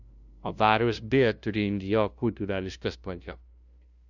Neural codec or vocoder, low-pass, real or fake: codec, 16 kHz, 0.5 kbps, FunCodec, trained on LibriTTS, 25 frames a second; 7.2 kHz; fake